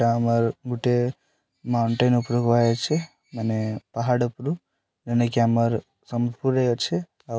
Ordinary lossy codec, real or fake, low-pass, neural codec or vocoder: none; real; none; none